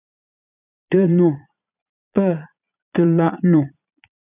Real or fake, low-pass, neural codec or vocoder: fake; 3.6 kHz; vocoder, 22.05 kHz, 80 mel bands, Vocos